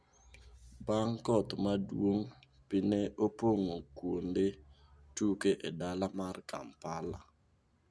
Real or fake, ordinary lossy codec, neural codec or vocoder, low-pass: real; none; none; none